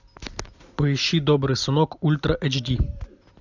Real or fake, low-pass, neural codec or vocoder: real; 7.2 kHz; none